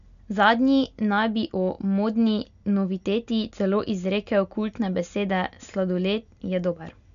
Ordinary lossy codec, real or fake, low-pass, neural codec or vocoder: none; real; 7.2 kHz; none